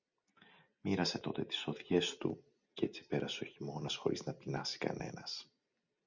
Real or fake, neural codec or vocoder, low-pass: real; none; 7.2 kHz